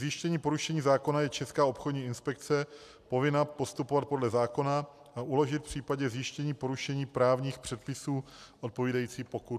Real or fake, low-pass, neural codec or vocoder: real; 14.4 kHz; none